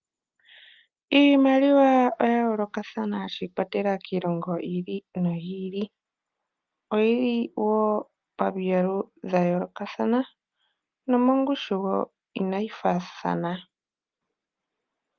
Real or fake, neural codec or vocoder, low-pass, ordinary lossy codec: real; none; 7.2 kHz; Opus, 32 kbps